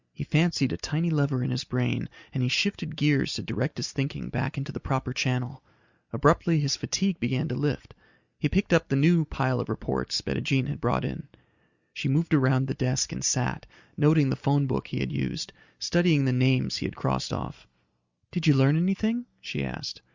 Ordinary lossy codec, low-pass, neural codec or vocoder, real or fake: Opus, 64 kbps; 7.2 kHz; none; real